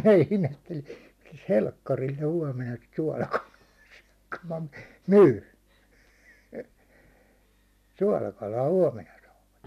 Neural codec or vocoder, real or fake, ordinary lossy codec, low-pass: none; real; none; 14.4 kHz